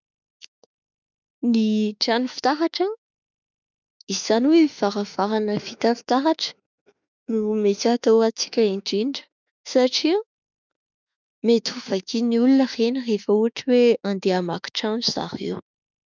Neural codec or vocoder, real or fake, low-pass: autoencoder, 48 kHz, 32 numbers a frame, DAC-VAE, trained on Japanese speech; fake; 7.2 kHz